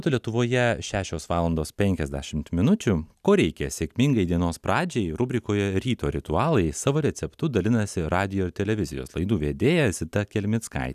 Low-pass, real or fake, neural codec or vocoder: 14.4 kHz; real; none